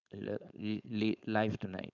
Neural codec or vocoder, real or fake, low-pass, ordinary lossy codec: codec, 16 kHz, 4.8 kbps, FACodec; fake; 7.2 kHz; none